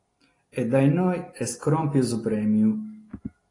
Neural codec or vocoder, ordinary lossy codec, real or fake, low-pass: none; AAC, 32 kbps; real; 10.8 kHz